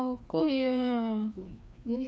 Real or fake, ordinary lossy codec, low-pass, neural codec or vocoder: fake; none; none; codec, 16 kHz, 2 kbps, FreqCodec, larger model